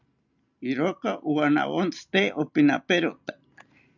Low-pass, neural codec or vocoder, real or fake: 7.2 kHz; none; real